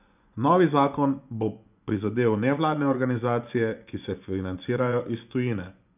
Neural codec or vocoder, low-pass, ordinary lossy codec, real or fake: vocoder, 24 kHz, 100 mel bands, Vocos; 3.6 kHz; none; fake